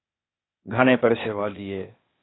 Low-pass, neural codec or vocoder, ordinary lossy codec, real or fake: 7.2 kHz; codec, 16 kHz, 0.8 kbps, ZipCodec; AAC, 16 kbps; fake